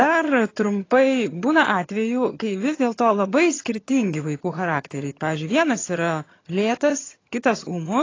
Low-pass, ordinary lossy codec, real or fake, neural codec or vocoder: 7.2 kHz; AAC, 32 kbps; fake; vocoder, 22.05 kHz, 80 mel bands, HiFi-GAN